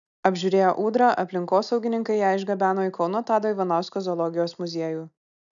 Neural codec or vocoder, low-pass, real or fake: none; 7.2 kHz; real